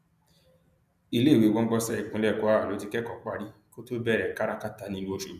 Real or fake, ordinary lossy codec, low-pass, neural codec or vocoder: real; none; 14.4 kHz; none